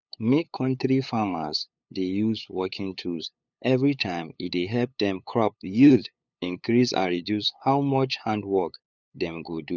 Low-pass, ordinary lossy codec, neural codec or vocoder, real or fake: 7.2 kHz; none; codec, 16 kHz, 8 kbps, FunCodec, trained on LibriTTS, 25 frames a second; fake